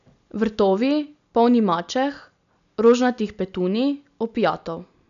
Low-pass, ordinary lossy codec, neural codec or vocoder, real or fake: 7.2 kHz; MP3, 96 kbps; none; real